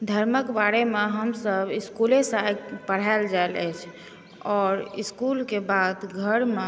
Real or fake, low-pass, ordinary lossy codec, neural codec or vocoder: real; none; none; none